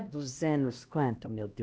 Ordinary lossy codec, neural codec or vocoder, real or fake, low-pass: none; codec, 16 kHz, 1 kbps, X-Codec, HuBERT features, trained on LibriSpeech; fake; none